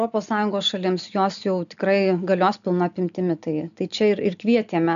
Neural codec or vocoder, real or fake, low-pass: none; real; 7.2 kHz